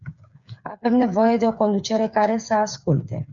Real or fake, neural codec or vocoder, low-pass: fake; codec, 16 kHz, 8 kbps, FreqCodec, smaller model; 7.2 kHz